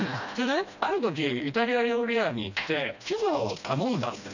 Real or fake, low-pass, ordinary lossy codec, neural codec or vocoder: fake; 7.2 kHz; none; codec, 16 kHz, 1 kbps, FreqCodec, smaller model